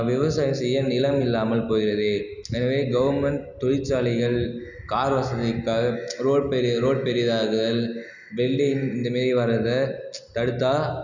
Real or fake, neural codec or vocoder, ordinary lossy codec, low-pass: real; none; none; 7.2 kHz